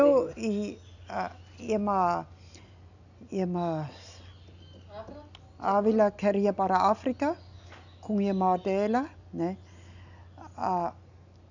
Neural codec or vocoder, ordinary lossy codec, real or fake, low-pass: none; none; real; 7.2 kHz